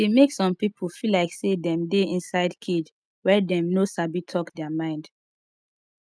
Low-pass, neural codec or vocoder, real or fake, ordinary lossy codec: none; none; real; none